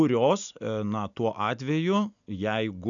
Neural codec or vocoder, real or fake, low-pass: none; real; 7.2 kHz